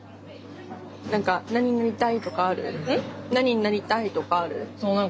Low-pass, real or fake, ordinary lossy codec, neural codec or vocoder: none; real; none; none